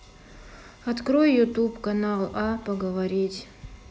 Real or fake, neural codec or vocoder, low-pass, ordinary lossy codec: real; none; none; none